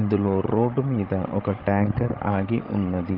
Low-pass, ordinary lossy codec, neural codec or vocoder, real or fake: 5.4 kHz; Opus, 16 kbps; codec, 16 kHz, 16 kbps, FreqCodec, larger model; fake